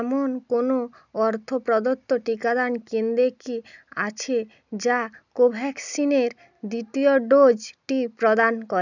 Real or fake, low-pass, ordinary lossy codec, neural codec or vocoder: real; 7.2 kHz; none; none